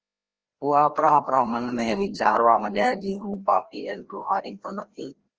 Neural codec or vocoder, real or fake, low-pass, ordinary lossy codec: codec, 16 kHz, 1 kbps, FreqCodec, larger model; fake; 7.2 kHz; Opus, 16 kbps